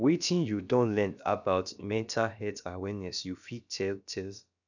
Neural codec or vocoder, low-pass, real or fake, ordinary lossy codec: codec, 16 kHz, about 1 kbps, DyCAST, with the encoder's durations; 7.2 kHz; fake; none